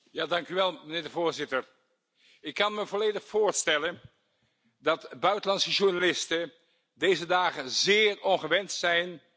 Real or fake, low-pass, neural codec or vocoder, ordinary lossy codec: real; none; none; none